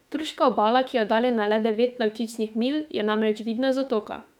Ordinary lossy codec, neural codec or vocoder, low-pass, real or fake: none; autoencoder, 48 kHz, 32 numbers a frame, DAC-VAE, trained on Japanese speech; 19.8 kHz; fake